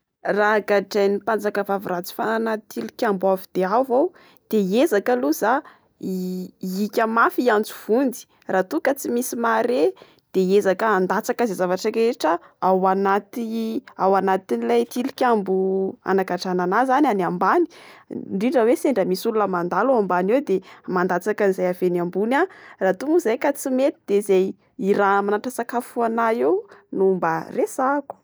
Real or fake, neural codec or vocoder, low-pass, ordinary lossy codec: real; none; none; none